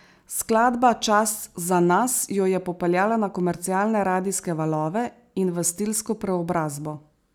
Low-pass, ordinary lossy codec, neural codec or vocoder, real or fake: none; none; none; real